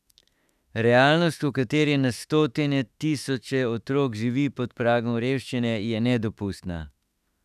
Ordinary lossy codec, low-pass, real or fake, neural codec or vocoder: none; 14.4 kHz; fake; autoencoder, 48 kHz, 32 numbers a frame, DAC-VAE, trained on Japanese speech